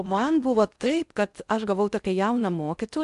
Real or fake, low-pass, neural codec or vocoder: fake; 10.8 kHz; codec, 16 kHz in and 24 kHz out, 0.6 kbps, FocalCodec, streaming, 4096 codes